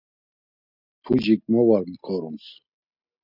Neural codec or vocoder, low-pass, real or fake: none; 5.4 kHz; real